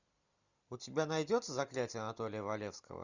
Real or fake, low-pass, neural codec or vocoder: real; 7.2 kHz; none